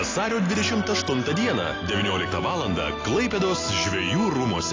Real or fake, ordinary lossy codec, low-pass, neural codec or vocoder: real; AAC, 32 kbps; 7.2 kHz; none